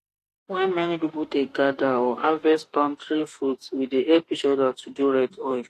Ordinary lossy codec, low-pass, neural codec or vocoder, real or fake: AAC, 48 kbps; 14.4 kHz; autoencoder, 48 kHz, 32 numbers a frame, DAC-VAE, trained on Japanese speech; fake